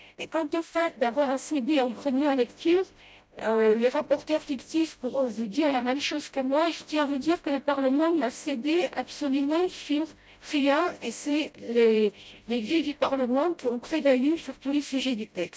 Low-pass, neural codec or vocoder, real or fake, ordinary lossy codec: none; codec, 16 kHz, 0.5 kbps, FreqCodec, smaller model; fake; none